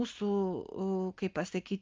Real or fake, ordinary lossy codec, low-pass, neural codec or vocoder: real; Opus, 24 kbps; 7.2 kHz; none